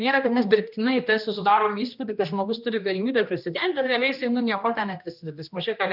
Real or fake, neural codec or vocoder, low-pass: fake; codec, 16 kHz, 1 kbps, X-Codec, HuBERT features, trained on general audio; 5.4 kHz